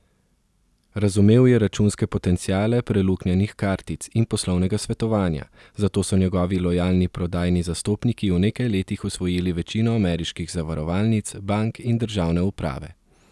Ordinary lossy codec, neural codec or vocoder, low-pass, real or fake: none; none; none; real